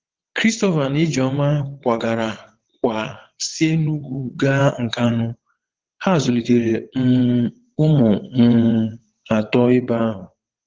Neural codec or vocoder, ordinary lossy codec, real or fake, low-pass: vocoder, 22.05 kHz, 80 mel bands, WaveNeXt; Opus, 16 kbps; fake; 7.2 kHz